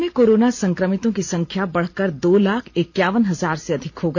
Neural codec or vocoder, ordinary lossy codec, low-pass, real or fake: none; none; 7.2 kHz; real